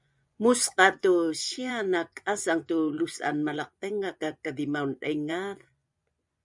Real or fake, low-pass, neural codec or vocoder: fake; 10.8 kHz; vocoder, 24 kHz, 100 mel bands, Vocos